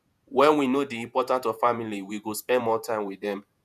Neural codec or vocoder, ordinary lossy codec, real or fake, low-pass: vocoder, 48 kHz, 128 mel bands, Vocos; none; fake; 14.4 kHz